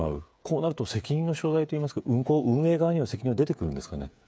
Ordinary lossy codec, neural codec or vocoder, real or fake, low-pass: none; codec, 16 kHz, 8 kbps, FreqCodec, smaller model; fake; none